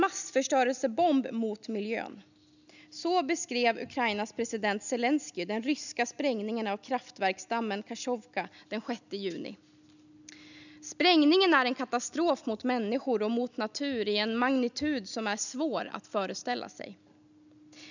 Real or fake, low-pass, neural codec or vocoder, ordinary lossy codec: real; 7.2 kHz; none; none